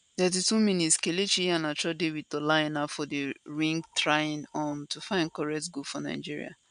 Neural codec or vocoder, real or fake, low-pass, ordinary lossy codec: none; real; 9.9 kHz; none